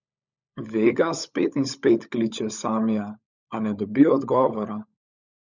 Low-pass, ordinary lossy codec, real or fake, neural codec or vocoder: 7.2 kHz; none; fake; codec, 16 kHz, 16 kbps, FunCodec, trained on LibriTTS, 50 frames a second